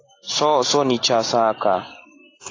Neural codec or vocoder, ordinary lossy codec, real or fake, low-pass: none; AAC, 32 kbps; real; 7.2 kHz